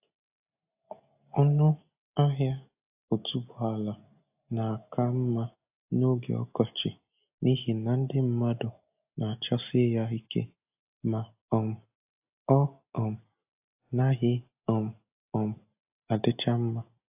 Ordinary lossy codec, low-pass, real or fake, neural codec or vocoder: AAC, 32 kbps; 3.6 kHz; real; none